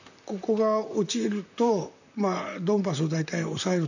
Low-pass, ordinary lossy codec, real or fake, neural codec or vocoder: 7.2 kHz; none; fake; vocoder, 44.1 kHz, 128 mel bands, Pupu-Vocoder